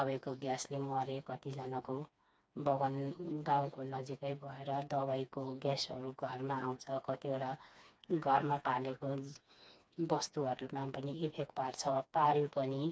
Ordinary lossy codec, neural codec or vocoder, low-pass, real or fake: none; codec, 16 kHz, 2 kbps, FreqCodec, smaller model; none; fake